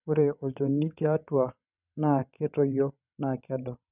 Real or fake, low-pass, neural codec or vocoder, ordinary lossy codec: fake; 3.6 kHz; vocoder, 22.05 kHz, 80 mel bands, WaveNeXt; none